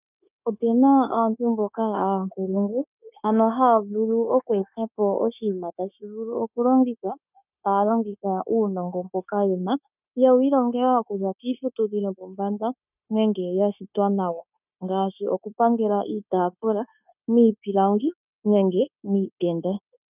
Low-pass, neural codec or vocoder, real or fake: 3.6 kHz; codec, 16 kHz, 0.9 kbps, LongCat-Audio-Codec; fake